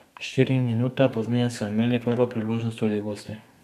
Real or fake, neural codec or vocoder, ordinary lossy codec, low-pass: fake; codec, 32 kHz, 1.9 kbps, SNAC; none; 14.4 kHz